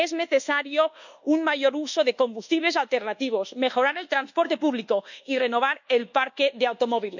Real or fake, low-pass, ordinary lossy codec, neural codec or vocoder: fake; 7.2 kHz; none; codec, 24 kHz, 1.2 kbps, DualCodec